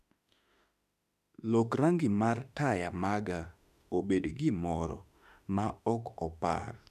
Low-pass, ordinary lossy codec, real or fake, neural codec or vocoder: 14.4 kHz; none; fake; autoencoder, 48 kHz, 32 numbers a frame, DAC-VAE, trained on Japanese speech